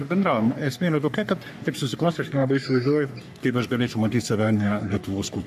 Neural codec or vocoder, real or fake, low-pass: codec, 44.1 kHz, 3.4 kbps, Pupu-Codec; fake; 14.4 kHz